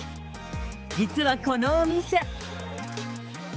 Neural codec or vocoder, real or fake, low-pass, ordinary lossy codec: codec, 16 kHz, 4 kbps, X-Codec, HuBERT features, trained on balanced general audio; fake; none; none